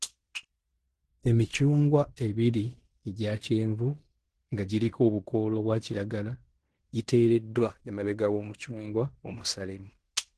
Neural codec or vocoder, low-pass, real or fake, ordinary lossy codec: codec, 16 kHz in and 24 kHz out, 0.9 kbps, LongCat-Audio-Codec, fine tuned four codebook decoder; 10.8 kHz; fake; Opus, 16 kbps